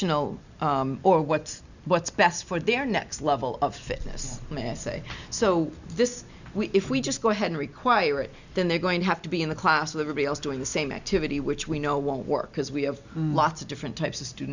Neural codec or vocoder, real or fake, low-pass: none; real; 7.2 kHz